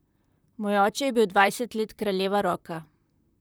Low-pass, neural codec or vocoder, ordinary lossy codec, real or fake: none; vocoder, 44.1 kHz, 128 mel bands, Pupu-Vocoder; none; fake